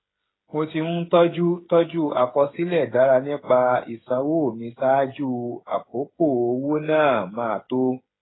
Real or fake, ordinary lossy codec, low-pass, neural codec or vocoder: fake; AAC, 16 kbps; 7.2 kHz; codec, 16 kHz, 8 kbps, FreqCodec, smaller model